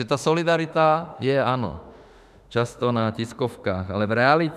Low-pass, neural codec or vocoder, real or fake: 14.4 kHz; autoencoder, 48 kHz, 32 numbers a frame, DAC-VAE, trained on Japanese speech; fake